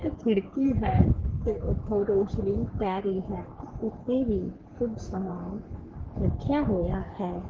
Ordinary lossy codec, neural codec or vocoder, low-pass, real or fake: Opus, 16 kbps; codec, 44.1 kHz, 3.4 kbps, Pupu-Codec; 7.2 kHz; fake